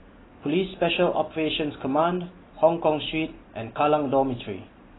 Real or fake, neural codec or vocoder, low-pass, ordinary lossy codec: real; none; 7.2 kHz; AAC, 16 kbps